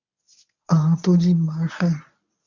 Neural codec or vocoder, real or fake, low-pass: codec, 24 kHz, 0.9 kbps, WavTokenizer, medium speech release version 1; fake; 7.2 kHz